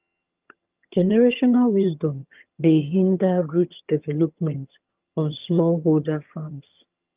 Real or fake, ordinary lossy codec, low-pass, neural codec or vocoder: fake; Opus, 24 kbps; 3.6 kHz; vocoder, 22.05 kHz, 80 mel bands, HiFi-GAN